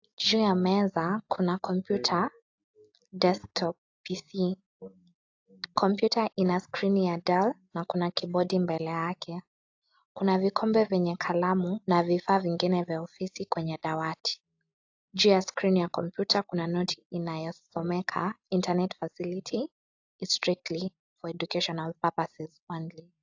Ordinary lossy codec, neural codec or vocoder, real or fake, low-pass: AAC, 48 kbps; none; real; 7.2 kHz